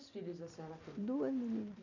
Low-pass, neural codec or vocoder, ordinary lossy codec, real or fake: 7.2 kHz; none; none; real